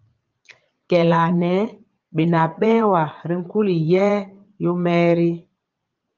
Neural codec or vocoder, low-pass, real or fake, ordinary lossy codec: vocoder, 22.05 kHz, 80 mel bands, Vocos; 7.2 kHz; fake; Opus, 32 kbps